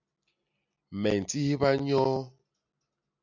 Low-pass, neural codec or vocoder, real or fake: 7.2 kHz; none; real